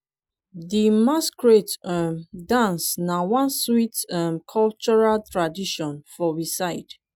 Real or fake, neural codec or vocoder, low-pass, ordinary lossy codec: real; none; none; none